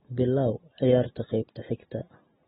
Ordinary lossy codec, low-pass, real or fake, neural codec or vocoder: AAC, 16 kbps; 10.8 kHz; real; none